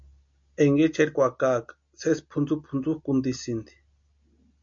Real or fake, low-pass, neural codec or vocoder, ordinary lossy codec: real; 7.2 kHz; none; MP3, 48 kbps